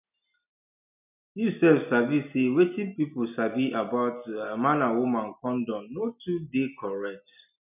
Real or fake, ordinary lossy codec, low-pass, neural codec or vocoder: real; none; 3.6 kHz; none